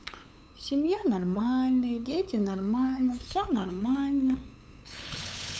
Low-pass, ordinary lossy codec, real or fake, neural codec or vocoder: none; none; fake; codec, 16 kHz, 8 kbps, FunCodec, trained on LibriTTS, 25 frames a second